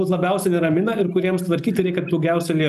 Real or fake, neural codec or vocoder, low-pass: real; none; 14.4 kHz